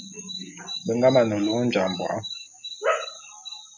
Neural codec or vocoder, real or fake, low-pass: none; real; 7.2 kHz